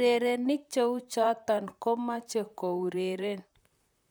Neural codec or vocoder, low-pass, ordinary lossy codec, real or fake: vocoder, 44.1 kHz, 128 mel bands, Pupu-Vocoder; none; none; fake